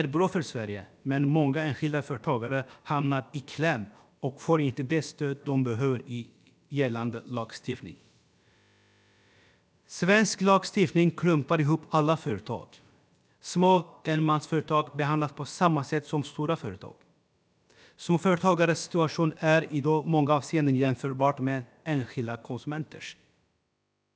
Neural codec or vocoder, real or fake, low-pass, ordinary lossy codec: codec, 16 kHz, about 1 kbps, DyCAST, with the encoder's durations; fake; none; none